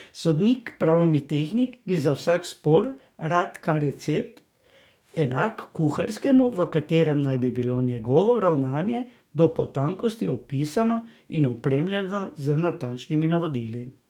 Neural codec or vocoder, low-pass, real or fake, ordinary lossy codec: codec, 44.1 kHz, 2.6 kbps, DAC; 19.8 kHz; fake; none